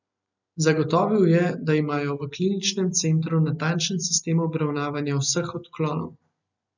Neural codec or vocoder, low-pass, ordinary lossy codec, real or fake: none; 7.2 kHz; none; real